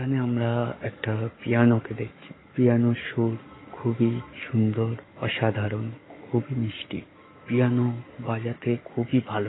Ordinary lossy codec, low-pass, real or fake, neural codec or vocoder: AAC, 16 kbps; 7.2 kHz; fake; codec, 44.1 kHz, 7.8 kbps, DAC